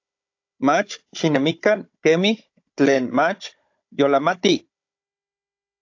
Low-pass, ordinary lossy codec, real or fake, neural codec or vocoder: 7.2 kHz; AAC, 48 kbps; fake; codec, 16 kHz, 16 kbps, FunCodec, trained on Chinese and English, 50 frames a second